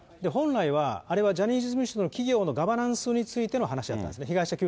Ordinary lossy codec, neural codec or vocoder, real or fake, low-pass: none; none; real; none